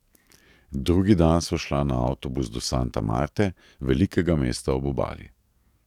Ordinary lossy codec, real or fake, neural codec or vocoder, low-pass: none; fake; codec, 44.1 kHz, 7.8 kbps, DAC; 19.8 kHz